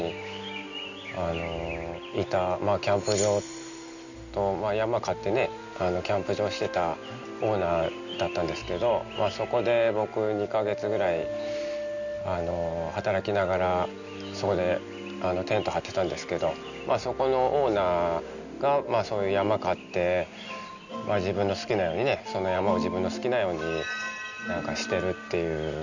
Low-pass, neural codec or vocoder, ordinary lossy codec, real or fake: 7.2 kHz; none; none; real